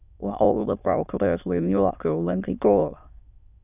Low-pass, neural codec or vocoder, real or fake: 3.6 kHz; autoencoder, 22.05 kHz, a latent of 192 numbers a frame, VITS, trained on many speakers; fake